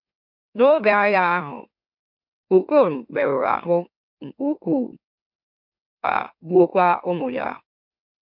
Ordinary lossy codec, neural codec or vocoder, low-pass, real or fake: MP3, 48 kbps; autoencoder, 44.1 kHz, a latent of 192 numbers a frame, MeloTTS; 5.4 kHz; fake